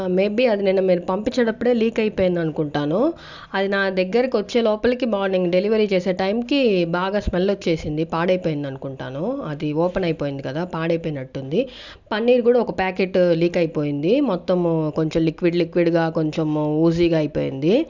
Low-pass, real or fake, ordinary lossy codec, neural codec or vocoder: 7.2 kHz; real; none; none